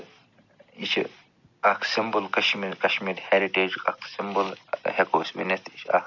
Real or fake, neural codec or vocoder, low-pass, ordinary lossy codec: real; none; 7.2 kHz; none